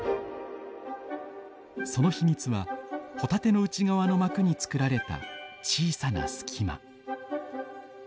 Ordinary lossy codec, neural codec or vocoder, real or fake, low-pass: none; none; real; none